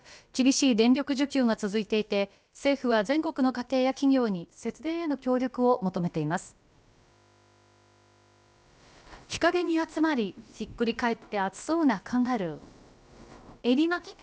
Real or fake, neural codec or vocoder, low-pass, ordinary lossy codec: fake; codec, 16 kHz, about 1 kbps, DyCAST, with the encoder's durations; none; none